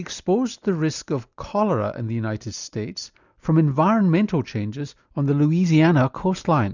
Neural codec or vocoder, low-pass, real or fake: none; 7.2 kHz; real